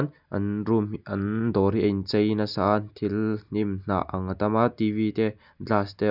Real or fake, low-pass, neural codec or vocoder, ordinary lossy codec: real; 5.4 kHz; none; none